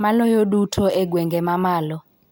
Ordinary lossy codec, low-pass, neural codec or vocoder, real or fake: none; none; none; real